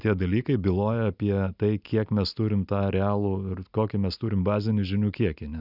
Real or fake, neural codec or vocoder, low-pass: real; none; 5.4 kHz